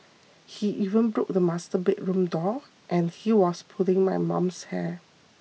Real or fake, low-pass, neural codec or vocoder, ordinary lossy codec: real; none; none; none